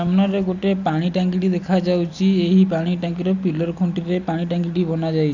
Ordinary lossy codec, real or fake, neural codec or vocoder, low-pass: none; real; none; 7.2 kHz